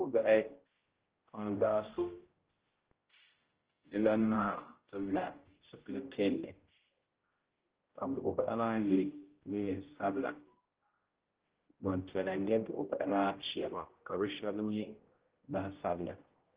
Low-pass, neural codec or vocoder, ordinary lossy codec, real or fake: 3.6 kHz; codec, 16 kHz, 0.5 kbps, X-Codec, HuBERT features, trained on general audio; Opus, 16 kbps; fake